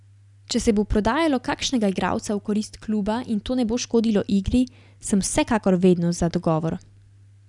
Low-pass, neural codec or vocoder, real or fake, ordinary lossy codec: 10.8 kHz; none; real; none